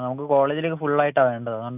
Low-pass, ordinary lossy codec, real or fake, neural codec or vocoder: 3.6 kHz; none; real; none